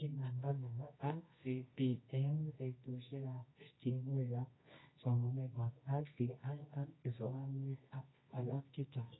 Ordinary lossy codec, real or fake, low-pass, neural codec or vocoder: AAC, 16 kbps; fake; 7.2 kHz; codec, 24 kHz, 0.9 kbps, WavTokenizer, medium music audio release